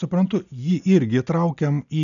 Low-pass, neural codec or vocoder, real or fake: 7.2 kHz; none; real